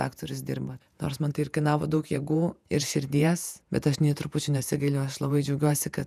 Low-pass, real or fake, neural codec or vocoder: 14.4 kHz; fake; vocoder, 48 kHz, 128 mel bands, Vocos